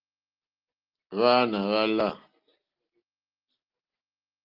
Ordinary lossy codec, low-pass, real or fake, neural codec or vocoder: Opus, 32 kbps; 5.4 kHz; real; none